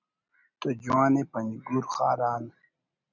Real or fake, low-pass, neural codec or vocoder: real; 7.2 kHz; none